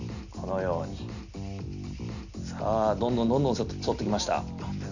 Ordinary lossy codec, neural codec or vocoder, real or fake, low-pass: none; none; real; 7.2 kHz